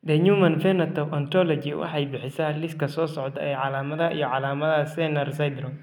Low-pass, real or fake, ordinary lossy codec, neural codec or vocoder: 19.8 kHz; real; none; none